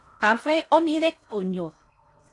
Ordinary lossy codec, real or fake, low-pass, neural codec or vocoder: AAC, 48 kbps; fake; 10.8 kHz; codec, 16 kHz in and 24 kHz out, 0.6 kbps, FocalCodec, streaming, 4096 codes